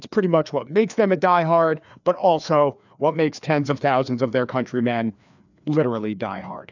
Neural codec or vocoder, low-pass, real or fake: codec, 16 kHz, 2 kbps, FreqCodec, larger model; 7.2 kHz; fake